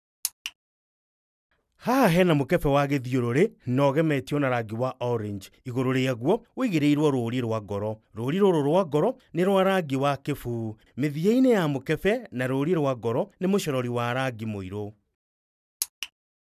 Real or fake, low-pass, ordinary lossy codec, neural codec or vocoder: real; 14.4 kHz; none; none